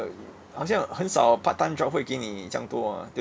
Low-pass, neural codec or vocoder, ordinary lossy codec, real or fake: none; none; none; real